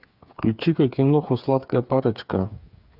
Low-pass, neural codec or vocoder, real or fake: 5.4 kHz; codec, 16 kHz, 8 kbps, FreqCodec, smaller model; fake